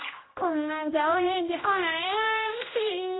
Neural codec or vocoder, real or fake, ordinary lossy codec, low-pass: codec, 16 kHz, 0.5 kbps, X-Codec, HuBERT features, trained on general audio; fake; AAC, 16 kbps; 7.2 kHz